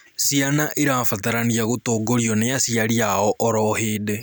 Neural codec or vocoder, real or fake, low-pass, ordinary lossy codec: none; real; none; none